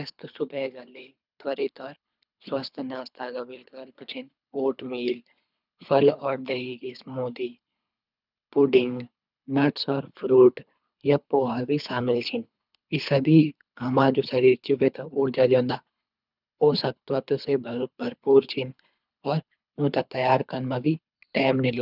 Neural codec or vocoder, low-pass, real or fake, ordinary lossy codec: codec, 24 kHz, 3 kbps, HILCodec; 5.4 kHz; fake; none